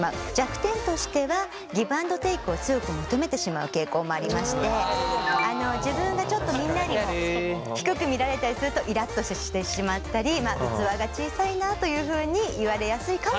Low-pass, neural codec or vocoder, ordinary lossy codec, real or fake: none; none; none; real